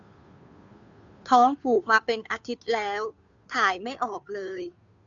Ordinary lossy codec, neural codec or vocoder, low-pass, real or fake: none; codec, 16 kHz, 2 kbps, FunCodec, trained on Chinese and English, 25 frames a second; 7.2 kHz; fake